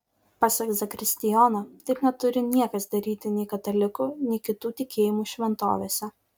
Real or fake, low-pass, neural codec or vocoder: real; 19.8 kHz; none